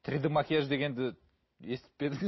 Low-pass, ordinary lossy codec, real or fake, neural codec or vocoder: 7.2 kHz; MP3, 24 kbps; real; none